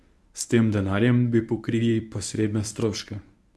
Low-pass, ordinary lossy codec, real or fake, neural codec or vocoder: none; none; fake; codec, 24 kHz, 0.9 kbps, WavTokenizer, medium speech release version 1